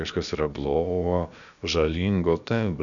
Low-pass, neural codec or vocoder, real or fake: 7.2 kHz; codec, 16 kHz, about 1 kbps, DyCAST, with the encoder's durations; fake